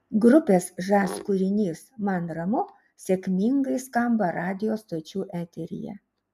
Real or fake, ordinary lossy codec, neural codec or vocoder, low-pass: real; MP3, 96 kbps; none; 14.4 kHz